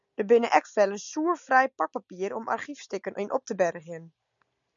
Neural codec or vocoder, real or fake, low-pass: none; real; 7.2 kHz